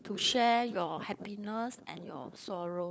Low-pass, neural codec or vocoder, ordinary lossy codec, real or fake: none; codec, 16 kHz, 16 kbps, FunCodec, trained on LibriTTS, 50 frames a second; none; fake